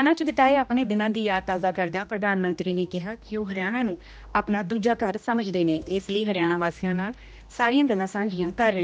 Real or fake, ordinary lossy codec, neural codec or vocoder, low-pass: fake; none; codec, 16 kHz, 1 kbps, X-Codec, HuBERT features, trained on general audio; none